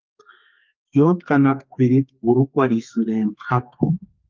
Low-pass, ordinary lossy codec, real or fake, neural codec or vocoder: 7.2 kHz; Opus, 24 kbps; fake; codec, 32 kHz, 1.9 kbps, SNAC